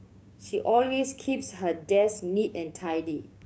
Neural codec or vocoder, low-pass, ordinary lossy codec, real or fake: codec, 16 kHz, 6 kbps, DAC; none; none; fake